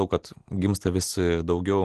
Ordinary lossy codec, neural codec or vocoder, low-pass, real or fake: Opus, 16 kbps; none; 10.8 kHz; real